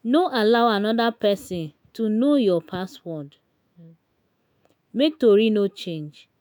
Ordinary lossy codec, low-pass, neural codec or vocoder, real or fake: none; none; autoencoder, 48 kHz, 128 numbers a frame, DAC-VAE, trained on Japanese speech; fake